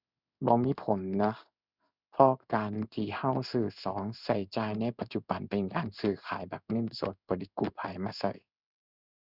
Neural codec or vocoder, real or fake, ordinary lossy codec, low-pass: codec, 16 kHz in and 24 kHz out, 1 kbps, XY-Tokenizer; fake; none; 5.4 kHz